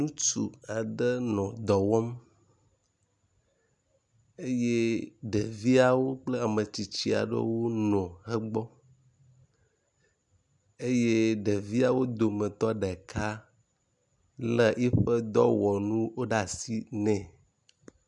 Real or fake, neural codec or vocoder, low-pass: real; none; 10.8 kHz